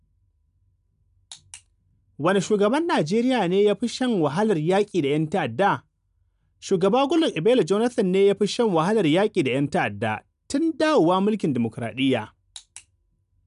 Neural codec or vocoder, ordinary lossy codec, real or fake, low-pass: none; none; real; 10.8 kHz